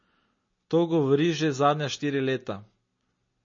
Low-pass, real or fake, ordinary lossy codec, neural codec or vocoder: 7.2 kHz; real; MP3, 32 kbps; none